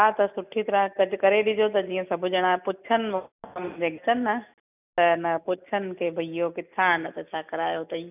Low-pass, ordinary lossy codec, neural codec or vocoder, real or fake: 3.6 kHz; none; none; real